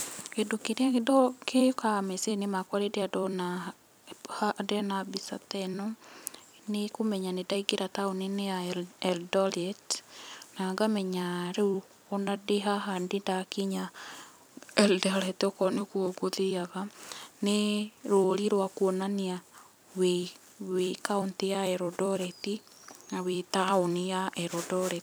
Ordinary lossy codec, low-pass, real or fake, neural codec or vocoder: none; none; fake; vocoder, 44.1 kHz, 128 mel bands every 256 samples, BigVGAN v2